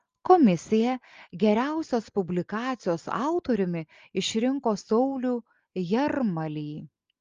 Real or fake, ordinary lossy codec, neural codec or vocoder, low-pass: real; Opus, 32 kbps; none; 7.2 kHz